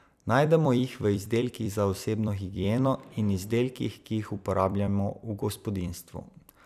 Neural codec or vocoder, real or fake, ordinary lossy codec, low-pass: vocoder, 44.1 kHz, 128 mel bands every 256 samples, BigVGAN v2; fake; none; 14.4 kHz